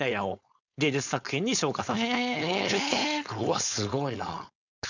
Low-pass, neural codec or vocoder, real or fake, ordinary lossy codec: 7.2 kHz; codec, 16 kHz, 4.8 kbps, FACodec; fake; none